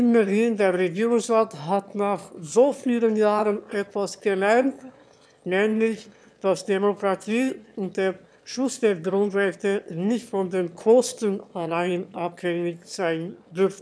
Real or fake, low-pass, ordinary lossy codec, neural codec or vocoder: fake; none; none; autoencoder, 22.05 kHz, a latent of 192 numbers a frame, VITS, trained on one speaker